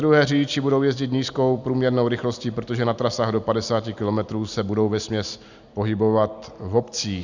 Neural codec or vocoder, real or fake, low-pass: none; real; 7.2 kHz